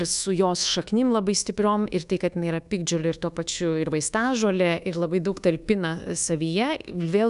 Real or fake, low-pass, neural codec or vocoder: fake; 10.8 kHz; codec, 24 kHz, 1.2 kbps, DualCodec